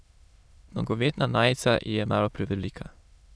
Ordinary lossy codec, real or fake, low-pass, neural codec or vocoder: none; fake; none; autoencoder, 22.05 kHz, a latent of 192 numbers a frame, VITS, trained on many speakers